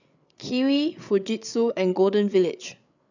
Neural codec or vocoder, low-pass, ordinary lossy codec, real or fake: codec, 16 kHz, 8 kbps, FreqCodec, larger model; 7.2 kHz; none; fake